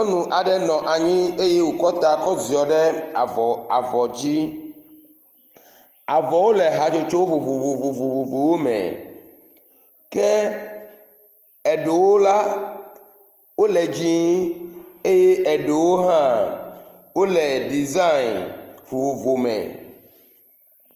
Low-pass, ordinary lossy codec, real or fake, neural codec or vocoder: 14.4 kHz; Opus, 24 kbps; real; none